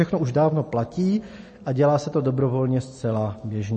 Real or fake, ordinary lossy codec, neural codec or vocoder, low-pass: real; MP3, 32 kbps; none; 9.9 kHz